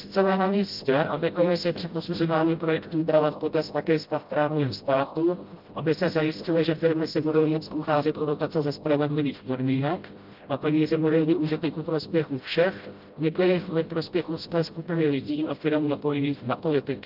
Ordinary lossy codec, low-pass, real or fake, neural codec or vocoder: Opus, 24 kbps; 5.4 kHz; fake; codec, 16 kHz, 0.5 kbps, FreqCodec, smaller model